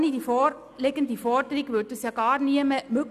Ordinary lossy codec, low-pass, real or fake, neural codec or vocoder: none; 14.4 kHz; real; none